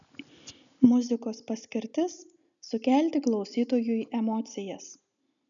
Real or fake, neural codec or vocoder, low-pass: real; none; 7.2 kHz